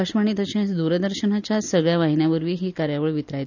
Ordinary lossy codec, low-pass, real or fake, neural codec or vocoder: none; 7.2 kHz; real; none